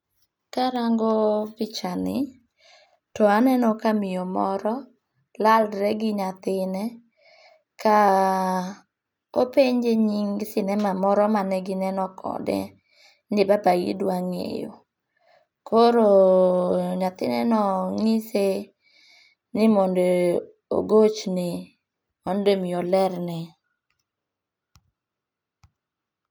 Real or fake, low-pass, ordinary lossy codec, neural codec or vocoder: real; none; none; none